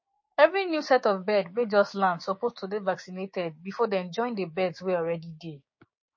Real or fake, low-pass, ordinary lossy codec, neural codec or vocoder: fake; 7.2 kHz; MP3, 32 kbps; codec, 16 kHz, 6 kbps, DAC